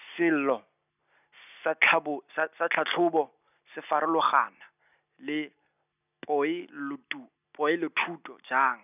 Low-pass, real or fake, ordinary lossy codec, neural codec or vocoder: 3.6 kHz; real; none; none